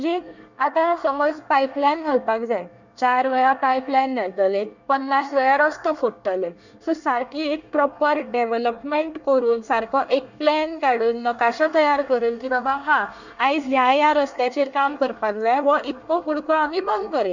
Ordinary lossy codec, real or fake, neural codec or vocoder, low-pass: none; fake; codec, 24 kHz, 1 kbps, SNAC; 7.2 kHz